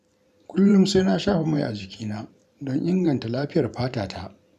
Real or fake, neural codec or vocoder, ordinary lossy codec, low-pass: fake; vocoder, 44.1 kHz, 128 mel bands every 512 samples, BigVGAN v2; none; 14.4 kHz